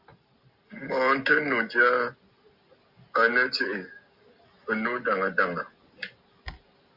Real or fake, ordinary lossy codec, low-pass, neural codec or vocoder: real; Opus, 64 kbps; 5.4 kHz; none